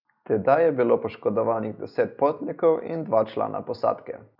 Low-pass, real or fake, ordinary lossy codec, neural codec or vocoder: 5.4 kHz; real; none; none